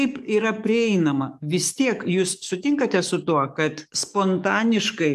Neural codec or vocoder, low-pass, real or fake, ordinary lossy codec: codec, 44.1 kHz, 7.8 kbps, DAC; 14.4 kHz; fake; AAC, 96 kbps